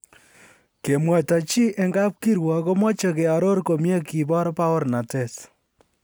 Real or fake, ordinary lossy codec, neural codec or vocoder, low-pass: real; none; none; none